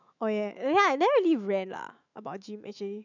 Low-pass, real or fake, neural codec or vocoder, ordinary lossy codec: 7.2 kHz; fake; autoencoder, 48 kHz, 128 numbers a frame, DAC-VAE, trained on Japanese speech; none